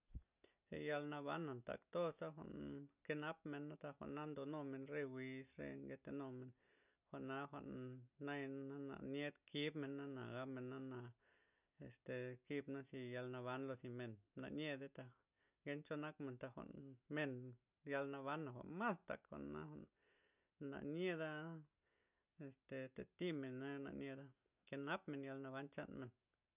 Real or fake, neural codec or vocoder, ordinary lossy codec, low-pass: real; none; none; 3.6 kHz